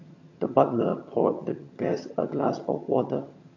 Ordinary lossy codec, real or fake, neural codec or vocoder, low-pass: MP3, 48 kbps; fake; vocoder, 22.05 kHz, 80 mel bands, HiFi-GAN; 7.2 kHz